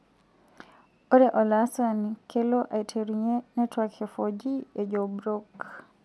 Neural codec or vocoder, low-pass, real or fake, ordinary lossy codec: none; none; real; none